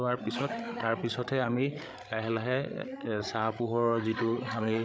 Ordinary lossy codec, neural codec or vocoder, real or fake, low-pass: none; codec, 16 kHz, 16 kbps, FreqCodec, larger model; fake; none